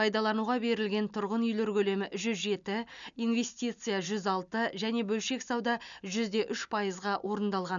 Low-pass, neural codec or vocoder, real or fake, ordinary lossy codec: 7.2 kHz; none; real; none